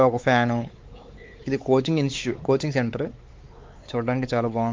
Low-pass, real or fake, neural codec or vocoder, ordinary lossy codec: 7.2 kHz; fake; codec, 16 kHz, 4 kbps, X-Codec, WavLM features, trained on Multilingual LibriSpeech; Opus, 32 kbps